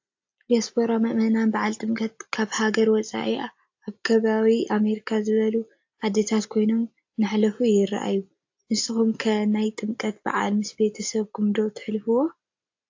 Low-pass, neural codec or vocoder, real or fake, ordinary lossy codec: 7.2 kHz; none; real; AAC, 48 kbps